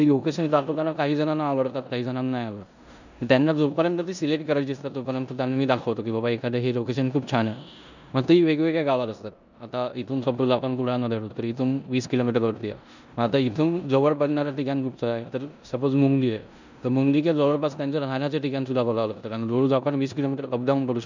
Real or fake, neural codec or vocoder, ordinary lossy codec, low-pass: fake; codec, 16 kHz in and 24 kHz out, 0.9 kbps, LongCat-Audio-Codec, four codebook decoder; none; 7.2 kHz